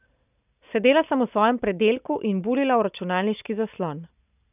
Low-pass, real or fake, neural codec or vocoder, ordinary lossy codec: 3.6 kHz; real; none; none